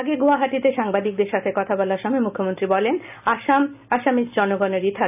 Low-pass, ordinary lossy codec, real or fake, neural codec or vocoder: 3.6 kHz; none; real; none